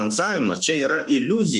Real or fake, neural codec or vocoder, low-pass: fake; autoencoder, 48 kHz, 32 numbers a frame, DAC-VAE, trained on Japanese speech; 10.8 kHz